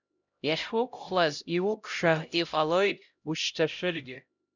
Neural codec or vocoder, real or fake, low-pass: codec, 16 kHz, 0.5 kbps, X-Codec, HuBERT features, trained on LibriSpeech; fake; 7.2 kHz